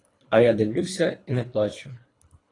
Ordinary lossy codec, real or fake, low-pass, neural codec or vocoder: AAC, 32 kbps; fake; 10.8 kHz; codec, 24 kHz, 3 kbps, HILCodec